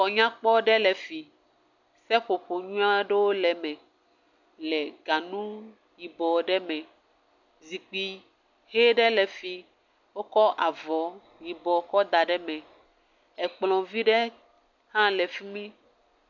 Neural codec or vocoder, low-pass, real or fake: none; 7.2 kHz; real